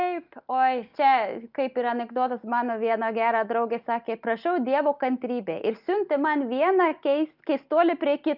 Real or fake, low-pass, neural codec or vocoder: real; 5.4 kHz; none